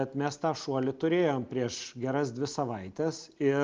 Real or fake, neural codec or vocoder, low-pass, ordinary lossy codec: real; none; 7.2 kHz; Opus, 24 kbps